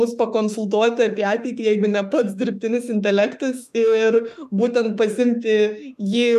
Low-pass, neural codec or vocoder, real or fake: 14.4 kHz; autoencoder, 48 kHz, 32 numbers a frame, DAC-VAE, trained on Japanese speech; fake